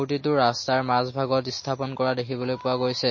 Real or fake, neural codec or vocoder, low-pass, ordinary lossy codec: real; none; 7.2 kHz; MP3, 32 kbps